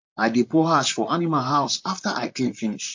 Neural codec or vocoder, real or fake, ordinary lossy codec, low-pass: vocoder, 44.1 kHz, 128 mel bands, Pupu-Vocoder; fake; MP3, 48 kbps; 7.2 kHz